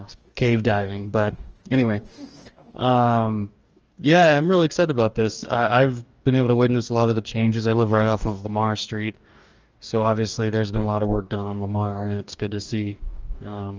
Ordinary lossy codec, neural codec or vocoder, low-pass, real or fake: Opus, 24 kbps; codec, 44.1 kHz, 2.6 kbps, DAC; 7.2 kHz; fake